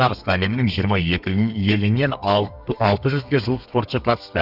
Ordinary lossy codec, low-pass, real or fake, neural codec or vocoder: none; 5.4 kHz; fake; codec, 32 kHz, 1.9 kbps, SNAC